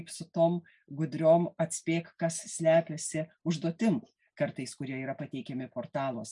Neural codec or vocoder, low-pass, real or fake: none; 10.8 kHz; real